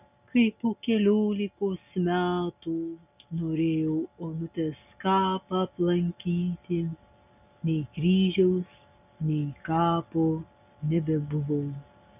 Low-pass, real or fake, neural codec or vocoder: 3.6 kHz; real; none